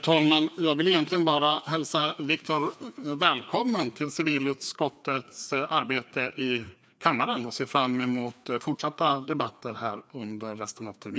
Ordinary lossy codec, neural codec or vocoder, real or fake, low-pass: none; codec, 16 kHz, 2 kbps, FreqCodec, larger model; fake; none